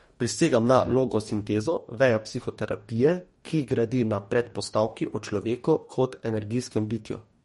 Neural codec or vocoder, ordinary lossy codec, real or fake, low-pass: codec, 44.1 kHz, 2.6 kbps, DAC; MP3, 48 kbps; fake; 19.8 kHz